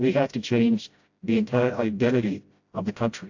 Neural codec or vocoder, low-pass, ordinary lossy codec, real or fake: codec, 16 kHz, 0.5 kbps, FreqCodec, smaller model; 7.2 kHz; AAC, 48 kbps; fake